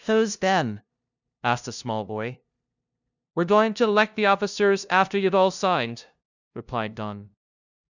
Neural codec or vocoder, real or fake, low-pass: codec, 16 kHz, 0.5 kbps, FunCodec, trained on LibriTTS, 25 frames a second; fake; 7.2 kHz